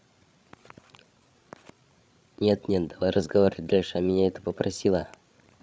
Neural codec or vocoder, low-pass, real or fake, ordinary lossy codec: codec, 16 kHz, 16 kbps, FreqCodec, larger model; none; fake; none